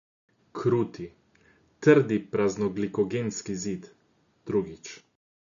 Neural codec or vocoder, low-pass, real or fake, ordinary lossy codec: none; 7.2 kHz; real; none